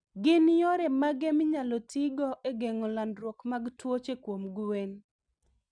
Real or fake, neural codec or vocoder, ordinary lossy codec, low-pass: real; none; none; 9.9 kHz